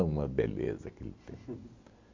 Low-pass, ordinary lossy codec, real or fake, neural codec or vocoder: 7.2 kHz; MP3, 48 kbps; real; none